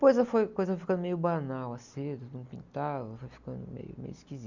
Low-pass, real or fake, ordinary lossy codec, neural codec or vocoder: 7.2 kHz; real; none; none